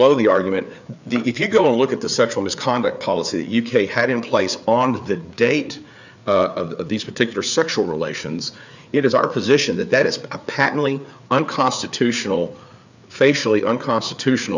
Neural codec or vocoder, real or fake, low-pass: codec, 16 kHz, 4 kbps, FreqCodec, larger model; fake; 7.2 kHz